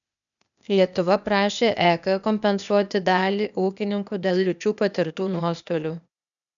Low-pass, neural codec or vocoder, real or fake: 7.2 kHz; codec, 16 kHz, 0.8 kbps, ZipCodec; fake